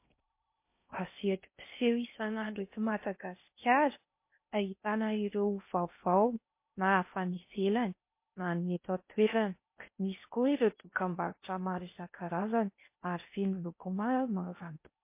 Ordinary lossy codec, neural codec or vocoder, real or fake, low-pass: MP3, 24 kbps; codec, 16 kHz in and 24 kHz out, 0.6 kbps, FocalCodec, streaming, 2048 codes; fake; 3.6 kHz